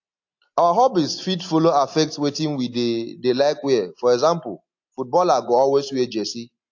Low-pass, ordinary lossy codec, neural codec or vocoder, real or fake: 7.2 kHz; AAC, 48 kbps; none; real